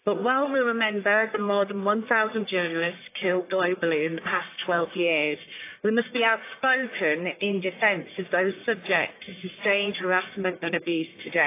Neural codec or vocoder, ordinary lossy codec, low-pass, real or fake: codec, 44.1 kHz, 1.7 kbps, Pupu-Codec; AAC, 24 kbps; 3.6 kHz; fake